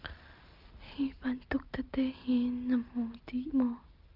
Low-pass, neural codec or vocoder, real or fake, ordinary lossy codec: 5.4 kHz; none; real; Opus, 24 kbps